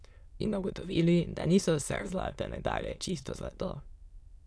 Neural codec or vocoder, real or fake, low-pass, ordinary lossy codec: autoencoder, 22.05 kHz, a latent of 192 numbers a frame, VITS, trained on many speakers; fake; none; none